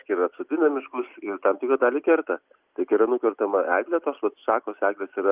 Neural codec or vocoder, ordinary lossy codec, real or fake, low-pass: none; Opus, 24 kbps; real; 3.6 kHz